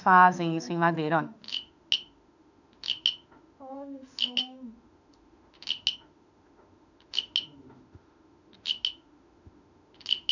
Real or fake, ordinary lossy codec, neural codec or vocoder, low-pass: fake; none; autoencoder, 48 kHz, 32 numbers a frame, DAC-VAE, trained on Japanese speech; 7.2 kHz